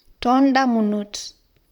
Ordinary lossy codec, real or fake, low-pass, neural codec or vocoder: none; fake; 19.8 kHz; vocoder, 44.1 kHz, 128 mel bands, Pupu-Vocoder